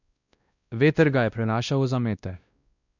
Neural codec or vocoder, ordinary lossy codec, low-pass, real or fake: codec, 16 kHz, 1 kbps, X-Codec, WavLM features, trained on Multilingual LibriSpeech; none; 7.2 kHz; fake